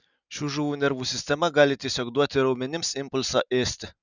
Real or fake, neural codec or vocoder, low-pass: real; none; 7.2 kHz